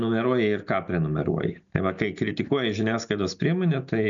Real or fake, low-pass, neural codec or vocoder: real; 7.2 kHz; none